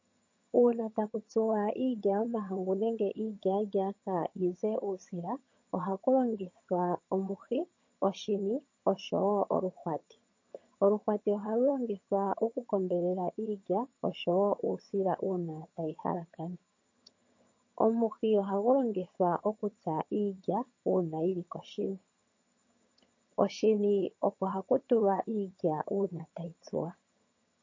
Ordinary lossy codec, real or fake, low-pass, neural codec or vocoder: MP3, 32 kbps; fake; 7.2 kHz; vocoder, 22.05 kHz, 80 mel bands, HiFi-GAN